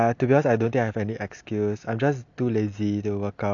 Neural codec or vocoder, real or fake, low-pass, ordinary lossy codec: none; real; 7.2 kHz; none